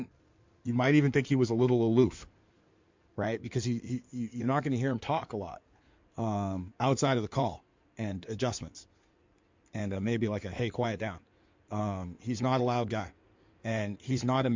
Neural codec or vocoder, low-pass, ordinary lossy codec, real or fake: codec, 16 kHz in and 24 kHz out, 2.2 kbps, FireRedTTS-2 codec; 7.2 kHz; MP3, 64 kbps; fake